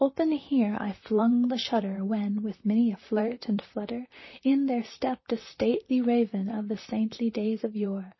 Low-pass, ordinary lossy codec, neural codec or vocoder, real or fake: 7.2 kHz; MP3, 24 kbps; vocoder, 44.1 kHz, 128 mel bands, Pupu-Vocoder; fake